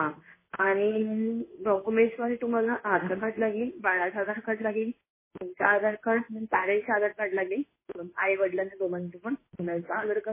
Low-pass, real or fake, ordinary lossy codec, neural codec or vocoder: 3.6 kHz; fake; MP3, 16 kbps; codec, 16 kHz in and 24 kHz out, 1 kbps, XY-Tokenizer